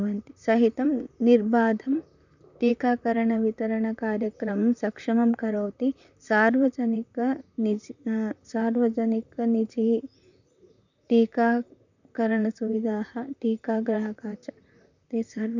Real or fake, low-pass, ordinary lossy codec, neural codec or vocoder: fake; 7.2 kHz; none; vocoder, 44.1 kHz, 128 mel bands, Pupu-Vocoder